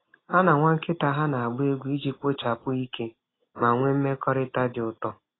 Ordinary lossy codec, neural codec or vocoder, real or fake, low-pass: AAC, 16 kbps; none; real; 7.2 kHz